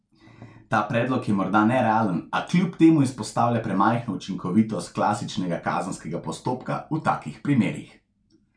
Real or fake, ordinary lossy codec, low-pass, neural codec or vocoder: real; none; 9.9 kHz; none